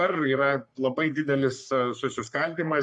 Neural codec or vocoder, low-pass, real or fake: codec, 44.1 kHz, 3.4 kbps, Pupu-Codec; 10.8 kHz; fake